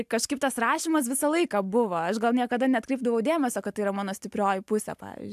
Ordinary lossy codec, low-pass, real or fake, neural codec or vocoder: AAC, 96 kbps; 14.4 kHz; real; none